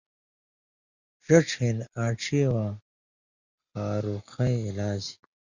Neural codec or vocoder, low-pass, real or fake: none; 7.2 kHz; real